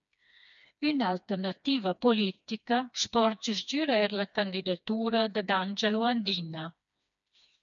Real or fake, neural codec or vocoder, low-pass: fake; codec, 16 kHz, 2 kbps, FreqCodec, smaller model; 7.2 kHz